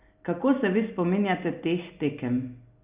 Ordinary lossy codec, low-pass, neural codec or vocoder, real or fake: Opus, 64 kbps; 3.6 kHz; none; real